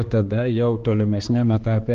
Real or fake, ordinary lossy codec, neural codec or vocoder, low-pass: fake; Opus, 16 kbps; codec, 16 kHz, 2 kbps, X-Codec, HuBERT features, trained on balanced general audio; 7.2 kHz